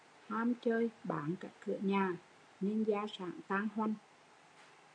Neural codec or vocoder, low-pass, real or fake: none; 9.9 kHz; real